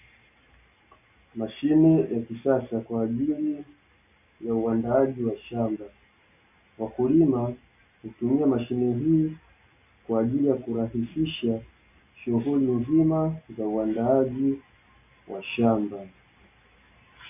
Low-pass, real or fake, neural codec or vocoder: 3.6 kHz; real; none